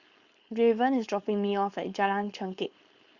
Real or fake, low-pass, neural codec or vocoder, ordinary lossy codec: fake; 7.2 kHz; codec, 16 kHz, 4.8 kbps, FACodec; Opus, 64 kbps